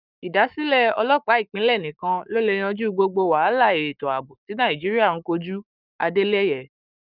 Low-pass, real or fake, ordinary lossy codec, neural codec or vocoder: 5.4 kHz; fake; none; codec, 44.1 kHz, 7.8 kbps, Pupu-Codec